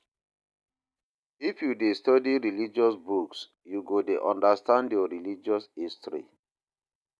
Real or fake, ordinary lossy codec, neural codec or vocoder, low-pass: real; none; none; none